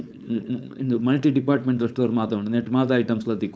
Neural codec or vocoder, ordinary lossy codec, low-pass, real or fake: codec, 16 kHz, 4.8 kbps, FACodec; none; none; fake